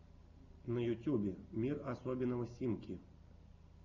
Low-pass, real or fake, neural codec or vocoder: 7.2 kHz; real; none